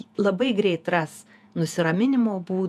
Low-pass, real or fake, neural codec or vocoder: 14.4 kHz; fake; vocoder, 48 kHz, 128 mel bands, Vocos